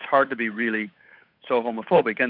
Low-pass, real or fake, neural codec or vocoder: 5.4 kHz; fake; codec, 16 kHz, 8 kbps, FunCodec, trained on Chinese and English, 25 frames a second